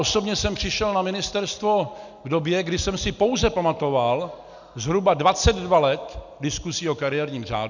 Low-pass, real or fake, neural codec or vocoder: 7.2 kHz; real; none